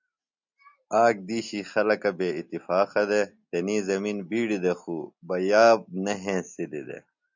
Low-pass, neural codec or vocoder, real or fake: 7.2 kHz; none; real